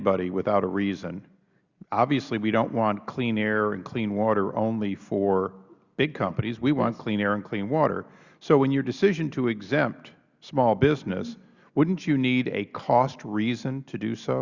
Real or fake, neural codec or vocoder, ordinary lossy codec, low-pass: real; none; Opus, 64 kbps; 7.2 kHz